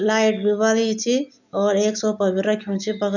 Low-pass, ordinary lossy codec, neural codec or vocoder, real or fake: 7.2 kHz; none; none; real